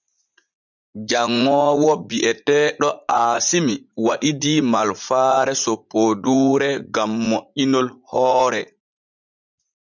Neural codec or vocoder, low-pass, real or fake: vocoder, 44.1 kHz, 80 mel bands, Vocos; 7.2 kHz; fake